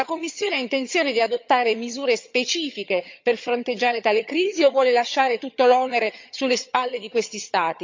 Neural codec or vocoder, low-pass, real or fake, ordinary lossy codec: vocoder, 22.05 kHz, 80 mel bands, HiFi-GAN; 7.2 kHz; fake; MP3, 64 kbps